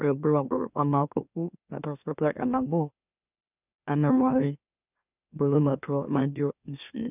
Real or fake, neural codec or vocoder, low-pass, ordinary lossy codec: fake; autoencoder, 44.1 kHz, a latent of 192 numbers a frame, MeloTTS; 3.6 kHz; none